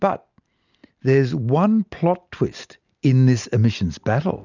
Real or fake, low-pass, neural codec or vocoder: real; 7.2 kHz; none